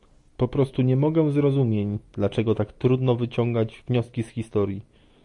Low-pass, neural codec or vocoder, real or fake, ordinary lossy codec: 10.8 kHz; none; real; AAC, 64 kbps